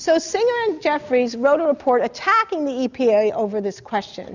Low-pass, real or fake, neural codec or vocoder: 7.2 kHz; real; none